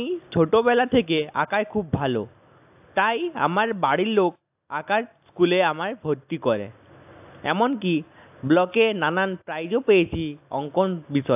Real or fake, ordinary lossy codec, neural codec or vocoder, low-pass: real; AAC, 32 kbps; none; 3.6 kHz